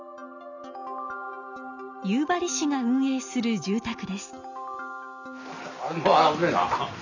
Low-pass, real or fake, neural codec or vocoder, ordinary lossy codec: 7.2 kHz; real; none; none